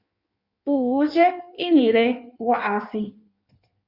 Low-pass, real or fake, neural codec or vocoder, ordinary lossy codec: 5.4 kHz; fake; codec, 16 kHz in and 24 kHz out, 1.1 kbps, FireRedTTS-2 codec; Opus, 64 kbps